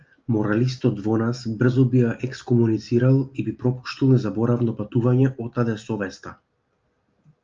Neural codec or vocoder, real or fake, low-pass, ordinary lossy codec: none; real; 7.2 kHz; Opus, 24 kbps